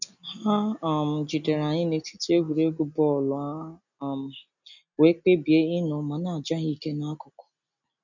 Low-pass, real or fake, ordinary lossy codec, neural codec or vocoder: 7.2 kHz; real; none; none